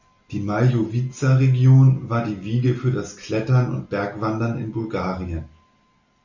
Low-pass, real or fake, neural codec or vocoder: 7.2 kHz; real; none